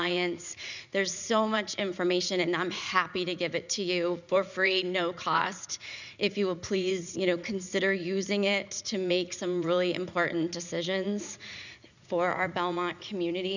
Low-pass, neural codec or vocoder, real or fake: 7.2 kHz; vocoder, 44.1 kHz, 80 mel bands, Vocos; fake